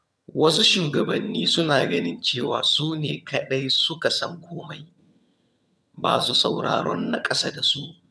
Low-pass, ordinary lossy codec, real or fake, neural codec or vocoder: none; none; fake; vocoder, 22.05 kHz, 80 mel bands, HiFi-GAN